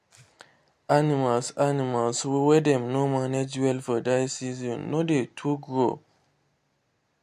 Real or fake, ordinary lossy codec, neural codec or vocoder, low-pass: real; MP3, 64 kbps; none; 14.4 kHz